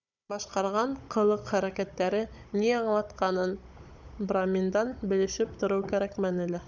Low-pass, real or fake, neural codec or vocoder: 7.2 kHz; fake; codec, 16 kHz, 16 kbps, FunCodec, trained on Chinese and English, 50 frames a second